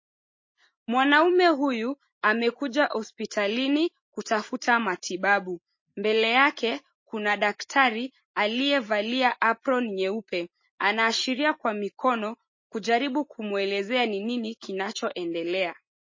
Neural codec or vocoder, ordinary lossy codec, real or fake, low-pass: none; MP3, 32 kbps; real; 7.2 kHz